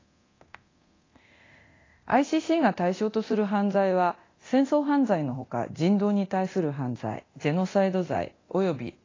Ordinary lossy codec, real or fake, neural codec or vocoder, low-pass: AAC, 32 kbps; fake; codec, 24 kHz, 0.9 kbps, DualCodec; 7.2 kHz